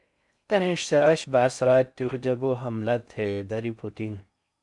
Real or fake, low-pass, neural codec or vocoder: fake; 10.8 kHz; codec, 16 kHz in and 24 kHz out, 0.6 kbps, FocalCodec, streaming, 4096 codes